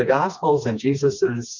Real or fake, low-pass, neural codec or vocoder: fake; 7.2 kHz; codec, 16 kHz, 2 kbps, FreqCodec, smaller model